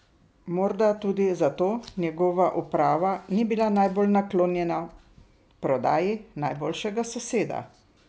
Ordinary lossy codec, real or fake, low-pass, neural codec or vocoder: none; real; none; none